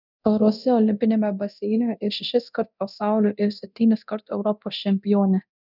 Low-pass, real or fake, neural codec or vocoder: 5.4 kHz; fake; codec, 24 kHz, 0.9 kbps, DualCodec